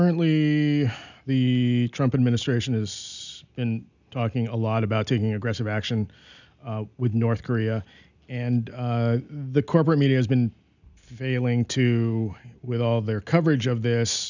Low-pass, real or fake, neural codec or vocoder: 7.2 kHz; real; none